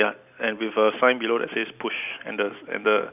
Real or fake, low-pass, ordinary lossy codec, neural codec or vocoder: real; 3.6 kHz; none; none